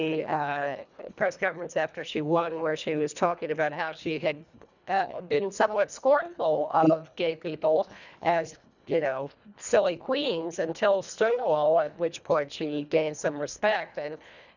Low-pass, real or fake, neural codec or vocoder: 7.2 kHz; fake; codec, 24 kHz, 1.5 kbps, HILCodec